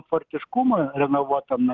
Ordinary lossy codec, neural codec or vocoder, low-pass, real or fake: Opus, 24 kbps; none; 7.2 kHz; real